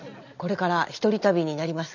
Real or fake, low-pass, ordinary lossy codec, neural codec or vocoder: real; 7.2 kHz; none; none